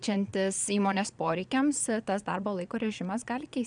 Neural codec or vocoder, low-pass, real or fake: vocoder, 22.05 kHz, 80 mel bands, Vocos; 9.9 kHz; fake